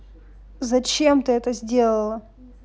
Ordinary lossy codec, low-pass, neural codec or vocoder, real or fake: none; none; none; real